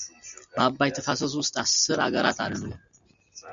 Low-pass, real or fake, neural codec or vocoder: 7.2 kHz; real; none